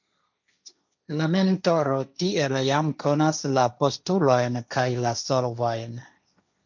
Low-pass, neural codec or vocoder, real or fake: 7.2 kHz; codec, 16 kHz, 1.1 kbps, Voila-Tokenizer; fake